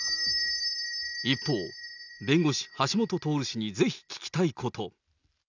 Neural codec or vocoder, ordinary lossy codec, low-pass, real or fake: none; none; 7.2 kHz; real